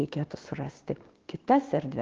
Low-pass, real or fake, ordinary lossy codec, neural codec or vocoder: 7.2 kHz; fake; Opus, 16 kbps; codec, 16 kHz, 6 kbps, DAC